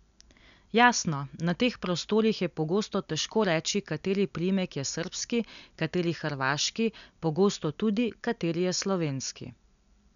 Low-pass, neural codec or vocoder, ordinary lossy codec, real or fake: 7.2 kHz; none; none; real